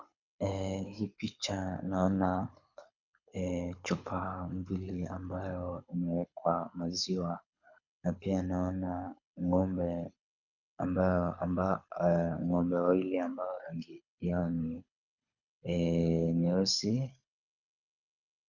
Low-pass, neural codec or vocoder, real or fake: 7.2 kHz; codec, 24 kHz, 6 kbps, HILCodec; fake